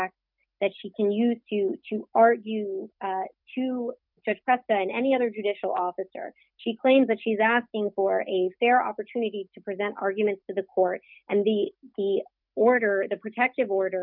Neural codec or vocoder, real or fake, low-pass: none; real; 5.4 kHz